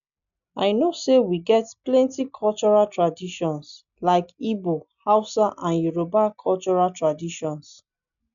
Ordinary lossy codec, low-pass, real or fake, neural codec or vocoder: none; 7.2 kHz; real; none